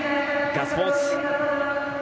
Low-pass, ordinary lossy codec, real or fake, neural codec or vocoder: none; none; real; none